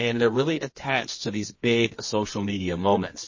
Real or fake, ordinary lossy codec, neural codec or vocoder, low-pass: fake; MP3, 32 kbps; codec, 24 kHz, 0.9 kbps, WavTokenizer, medium music audio release; 7.2 kHz